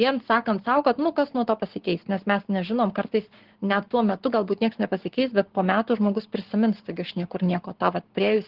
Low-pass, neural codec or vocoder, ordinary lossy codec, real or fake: 5.4 kHz; codec, 44.1 kHz, 7.8 kbps, Pupu-Codec; Opus, 16 kbps; fake